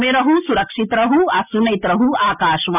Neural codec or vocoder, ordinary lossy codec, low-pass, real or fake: none; none; 3.6 kHz; real